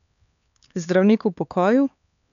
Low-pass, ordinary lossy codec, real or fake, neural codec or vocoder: 7.2 kHz; none; fake; codec, 16 kHz, 4 kbps, X-Codec, HuBERT features, trained on LibriSpeech